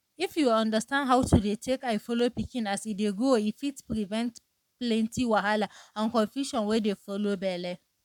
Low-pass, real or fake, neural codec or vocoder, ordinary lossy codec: 19.8 kHz; fake; codec, 44.1 kHz, 7.8 kbps, Pupu-Codec; none